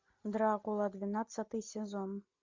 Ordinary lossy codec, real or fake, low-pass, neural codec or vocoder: MP3, 64 kbps; real; 7.2 kHz; none